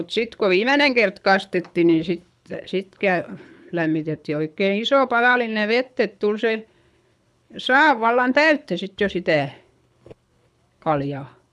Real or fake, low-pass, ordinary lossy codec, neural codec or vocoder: fake; none; none; codec, 24 kHz, 6 kbps, HILCodec